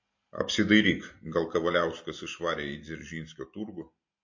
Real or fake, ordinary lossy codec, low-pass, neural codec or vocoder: real; MP3, 32 kbps; 7.2 kHz; none